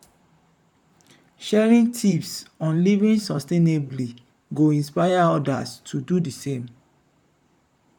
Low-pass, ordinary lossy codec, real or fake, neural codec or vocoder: 19.8 kHz; none; fake; vocoder, 44.1 kHz, 128 mel bands, Pupu-Vocoder